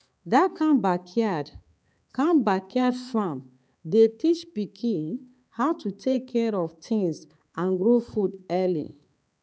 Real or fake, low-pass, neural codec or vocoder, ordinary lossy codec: fake; none; codec, 16 kHz, 4 kbps, X-Codec, HuBERT features, trained on balanced general audio; none